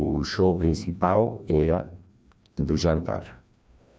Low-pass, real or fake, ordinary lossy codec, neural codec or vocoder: none; fake; none; codec, 16 kHz, 1 kbps, FreqCodec, larger model